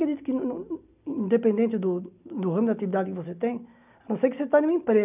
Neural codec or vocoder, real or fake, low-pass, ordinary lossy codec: none; real; 3.6 kHz; none